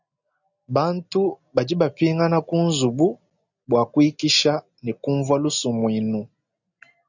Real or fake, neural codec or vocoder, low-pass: real; none; 7.2 kHz